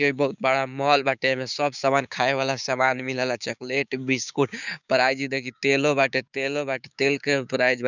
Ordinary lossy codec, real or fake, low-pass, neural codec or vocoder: none; fake; 7.2 kHz; codec, 16 kHz, 6 kbps, DAC